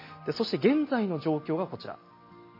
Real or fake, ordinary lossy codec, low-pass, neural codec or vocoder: real; MP3, 24 kbps; 5.4 kHz; none